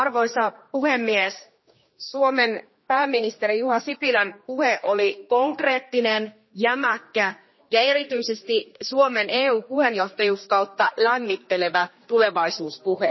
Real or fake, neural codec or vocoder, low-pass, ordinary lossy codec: fake; codec, 16 kHz, 2 kbps, X-Codec, HuBERT features, trained on general audio; 7.2 kHz; MP3, 24 kbps